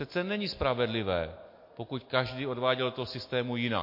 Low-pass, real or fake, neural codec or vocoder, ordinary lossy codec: 5.4 kHz; real; none; MP3, 32 kbps